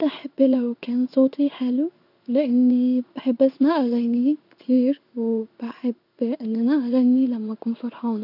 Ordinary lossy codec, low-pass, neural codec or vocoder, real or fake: none; 5.4 kHz; codec, 16 kHz in and 24 kHz out, 1 kbps, XY-Tokenizer; fake